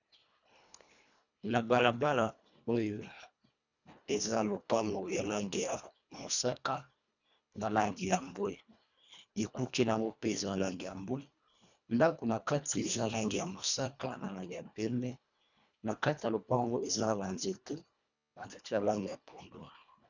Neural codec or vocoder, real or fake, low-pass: codec, 24 kHz, 1.5 kbps, HILCodec; fake; 7.2 kHz